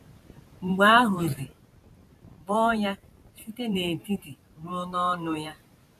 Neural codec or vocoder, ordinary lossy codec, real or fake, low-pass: vocoder, 44.1 kHz, 128 mel bands every 512 samples, BigVGAN v2; none; fake; 14.4 kHz